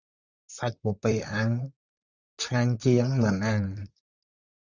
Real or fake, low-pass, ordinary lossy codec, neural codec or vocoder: fake; 7.2 kHz; Opus, 64 kbps; vocoder, 44.1 kHz, 128 mel bands, Pupu-Vocoder